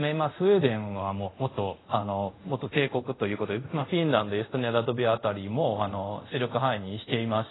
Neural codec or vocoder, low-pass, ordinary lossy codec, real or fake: codec, 24 kHz, 0.5 kbps, DualCodec; 7.2 kHz; AAC, 16 kbps; fake